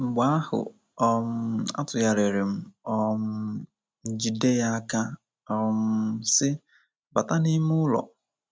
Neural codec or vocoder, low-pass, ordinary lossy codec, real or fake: none; none; none; real